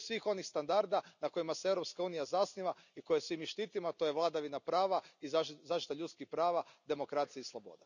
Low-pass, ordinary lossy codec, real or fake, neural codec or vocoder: 7.2 kHz; none; real; none